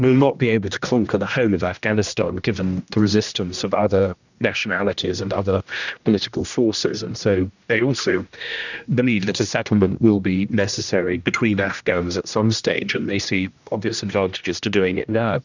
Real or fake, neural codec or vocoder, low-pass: fake; codec, 16 kHz, 1 kbps, X-Codec, HuBERT features, trained on general audio; 7.2 kHz